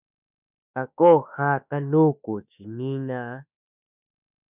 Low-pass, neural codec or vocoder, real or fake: 3.6 kHz; autoencoder, 48 kHz, 32 numbers a frame, DAC-VAE, trained on Japanese speech; fake